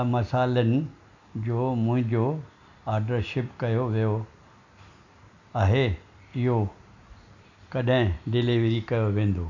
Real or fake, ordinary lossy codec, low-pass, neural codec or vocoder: real; none; 7.2 kHz; none